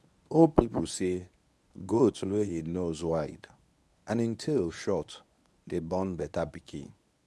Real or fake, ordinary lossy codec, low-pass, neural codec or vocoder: fake; none; none; codec, 24 kHz, 0.9 kbps, WavTokenizer, medium speech release version 1